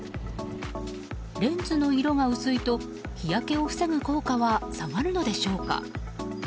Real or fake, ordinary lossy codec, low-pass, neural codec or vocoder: real; none; none; none